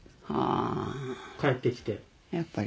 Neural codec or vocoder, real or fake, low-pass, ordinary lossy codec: none; real; none; none